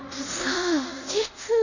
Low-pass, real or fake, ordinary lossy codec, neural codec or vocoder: 7.2 kHz; fake; none; codec, 24 kHz, 0.5 kbps, DualCodec